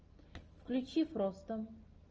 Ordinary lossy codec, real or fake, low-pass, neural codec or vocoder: Opus, 24 kbps; real; 7.2 kHz; none